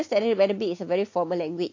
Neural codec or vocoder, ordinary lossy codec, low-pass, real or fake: none; AAC, 48 kbps; 7.2 kHz; real